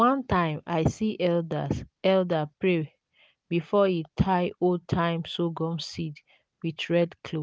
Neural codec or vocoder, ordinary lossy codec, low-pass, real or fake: none; none; none; real